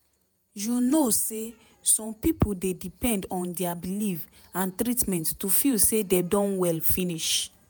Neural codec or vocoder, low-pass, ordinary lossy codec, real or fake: vocoder, 48 kHz, 128 mel bands, Vocos; none; none; fake